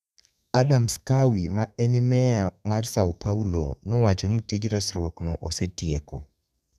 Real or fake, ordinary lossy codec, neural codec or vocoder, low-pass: fake; none; codec, 32 kHz, 1.9 kbps, SNAC; 14.4 kHz